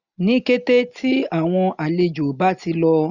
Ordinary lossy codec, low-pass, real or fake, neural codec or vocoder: none; 7.2 kHz; real; none